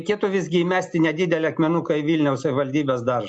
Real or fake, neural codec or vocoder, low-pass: real; none; 9.9 kHz